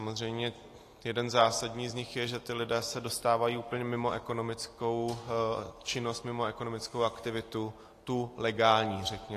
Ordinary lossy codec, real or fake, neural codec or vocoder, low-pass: AAC, 48 kbps; real; none; 14.4 kHz